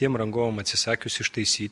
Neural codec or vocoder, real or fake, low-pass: none; real; 10.8 kHz